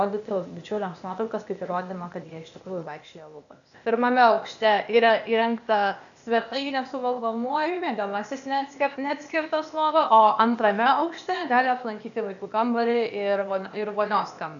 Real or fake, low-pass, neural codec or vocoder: fake; 7.2 kHz; codec, 16 kHz, 0.8 kbps, ZipCodec